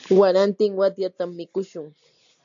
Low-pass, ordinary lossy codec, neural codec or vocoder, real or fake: 7.2 kHz; AAC, 64 kbps; none; real